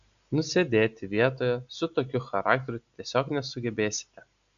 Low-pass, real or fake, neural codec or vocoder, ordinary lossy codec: 7.2 kHz; real; none; MP3, 96 kbps